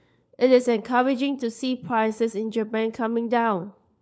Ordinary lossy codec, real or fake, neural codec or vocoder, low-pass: none; fake; codec, 16 kHz, 4 kbps, FunCodec, trained on LibriTTS, 50 frames a second; none